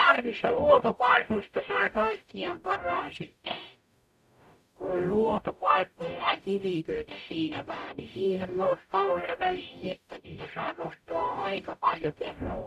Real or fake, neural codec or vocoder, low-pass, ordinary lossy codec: fake; codec, 44.1 kHz, 0.9 kbps, DAC; 14.4 kHz; none